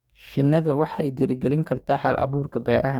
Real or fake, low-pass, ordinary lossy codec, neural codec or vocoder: fake; 19.8 kHz; none; codec, 44.1 kHz, 2.6 kbps, DAC